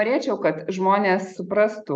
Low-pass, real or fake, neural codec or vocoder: 9.9 kHz; real; none